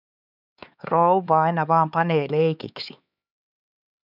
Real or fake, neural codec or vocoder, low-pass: fake; codec, 16 kHz, 4 kbps, X-Codec, HuBERT features, trained on LibriSpeech; 5.4 kHz